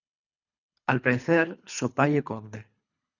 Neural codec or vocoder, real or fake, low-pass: codec, 24 kHz, 3 kbps, HILCodec; fake; 7.2 kHz